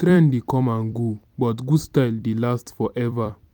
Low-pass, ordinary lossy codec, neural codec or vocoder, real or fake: 19.8 kHz; none; vocoder, 44.1 kHz, 128 mel bands every 256 samples, BigVGAN v2; fake